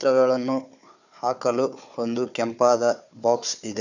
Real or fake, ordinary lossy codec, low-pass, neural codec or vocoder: fake; none; 7.2 kHz; codec, 16 kHz, 4 kbps, FunCodec, trained on Chinese and English, 50 frames a second